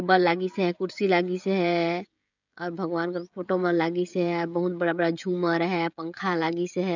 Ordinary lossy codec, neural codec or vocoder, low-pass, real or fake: none; codec, 16 kHz, 16 kbps, FreqCodec, smaller model; 7.2 kHz; fake